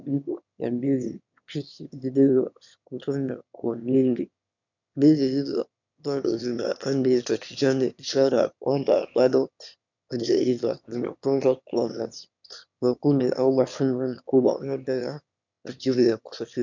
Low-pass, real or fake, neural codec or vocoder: 7.2 kHz; fake; autoencoder, 22.05 kHz, a latent of 192 numbers a frame, VITS, trained on one speaker